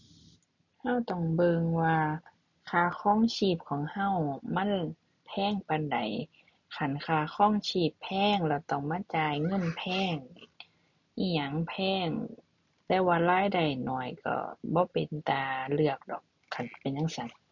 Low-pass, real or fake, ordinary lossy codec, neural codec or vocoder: 7.2 kHz; real; none; none